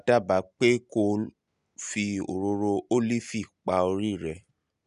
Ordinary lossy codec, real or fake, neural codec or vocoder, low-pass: none; real; none; 10.8 kHz